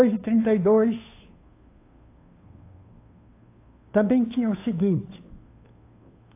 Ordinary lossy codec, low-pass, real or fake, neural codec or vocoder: AAC, 24 kbps; 3.6 kHz; fake; codec, 16 kHz, 2 kbps, FunCodec, trained on Chinese and English, 25 frames a second